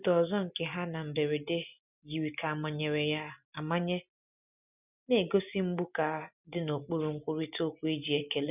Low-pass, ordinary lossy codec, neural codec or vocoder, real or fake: 3.6 kHz; none; none; real